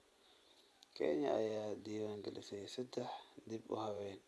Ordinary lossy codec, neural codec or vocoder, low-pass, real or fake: none; vocoder, 24 kHz, 100 mel bands, Vocos; none; fake